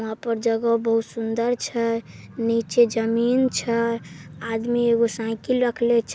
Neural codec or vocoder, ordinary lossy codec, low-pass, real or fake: none; none; none; real